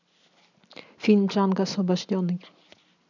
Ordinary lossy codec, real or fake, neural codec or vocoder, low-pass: none; real; none; 7.2 kHz